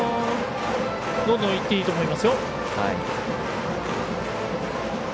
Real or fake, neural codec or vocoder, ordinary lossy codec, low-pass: real; none; none; none